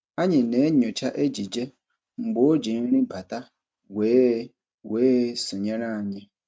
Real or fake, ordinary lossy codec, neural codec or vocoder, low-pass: real; none; none; none